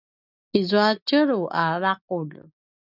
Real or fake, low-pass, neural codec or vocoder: real; 5.4 kHz; none